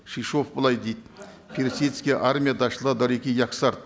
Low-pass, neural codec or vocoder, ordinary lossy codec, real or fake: none; none; none; real